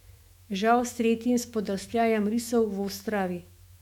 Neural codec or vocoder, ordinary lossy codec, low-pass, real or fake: autoencoder, 48 kHz, 128 numbers a frame, DAC-VAE, trained on Japanese speech; MP3, 96 kbps; 19.8 kHz; fake